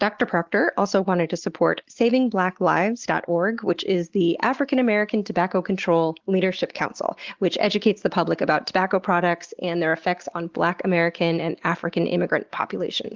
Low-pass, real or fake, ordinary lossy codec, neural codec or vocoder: 7.2 kHz; real; Opus, 16 kbps; none